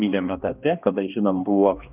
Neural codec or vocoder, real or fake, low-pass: codec, 16 kHz, 1 kbps, X-Codec, HuBERT features, trained on balanced general audio; fake; 3.6 kHz